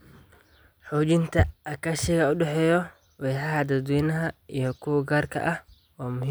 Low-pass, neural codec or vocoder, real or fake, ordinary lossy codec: none; none; real; none